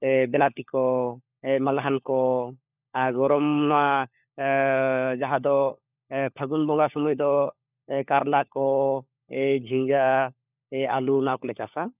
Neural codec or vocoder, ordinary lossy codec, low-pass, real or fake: codec, 16 kHz, 4 kbps, FunCodec, trained on LibriTTS, 50 frames a second; none; 3.6 kHz; fake